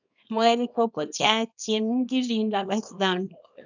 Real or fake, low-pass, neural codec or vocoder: fake; 7.2 kHz; codec, 24 kHz, 0.9 kbps, WavTokenizer, small release